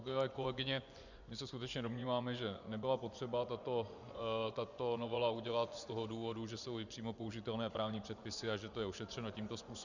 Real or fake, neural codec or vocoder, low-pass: fake; vocoder, 24 kHz, 100 mel bands, Vocos; 7.2 kHz